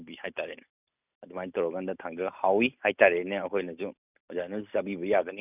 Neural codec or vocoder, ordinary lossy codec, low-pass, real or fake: none; none; 3.6 kHz; real